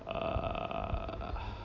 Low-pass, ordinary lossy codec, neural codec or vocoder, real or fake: 7.2 kHz; AAC, 48 kbps; none; real